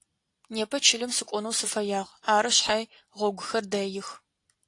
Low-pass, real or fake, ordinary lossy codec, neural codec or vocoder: 10.8 kHz; real; AAC, 48 kbps; none